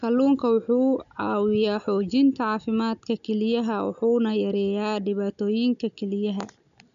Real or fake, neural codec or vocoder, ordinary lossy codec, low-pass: real; none; none; 7.2 kHz